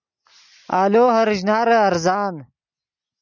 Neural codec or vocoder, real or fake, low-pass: none; real; 7.2 kHz